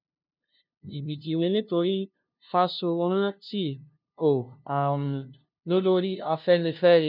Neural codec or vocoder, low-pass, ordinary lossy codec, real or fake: codec, 16 kHz, 0.5 kbps, FunCodec, trained on LibriTTS, 25 frames a second; 5.4 kHz; none; fake